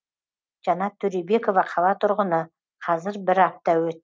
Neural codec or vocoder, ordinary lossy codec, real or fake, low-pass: none; none; real; none